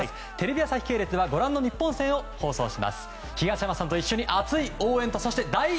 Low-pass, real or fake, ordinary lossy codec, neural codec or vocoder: none; real; none; none